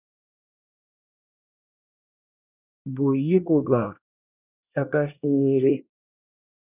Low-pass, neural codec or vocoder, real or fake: 3.6 kHz; codec, 24 kHz, 1 kbps, SNAC; fake